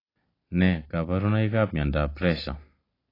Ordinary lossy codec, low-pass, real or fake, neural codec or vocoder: AAC, 24 kbps; 5.4 kHz; real; none